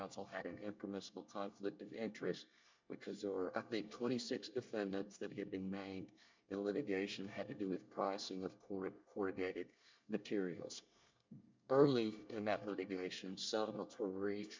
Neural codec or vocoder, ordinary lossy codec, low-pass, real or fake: codec, 24 kHz, 1 kbps, SNAC; MP3, 64 kbps; 7.2 kHz; fake